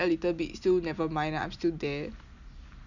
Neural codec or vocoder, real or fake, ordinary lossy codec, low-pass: none; real; none; 7.2 kHz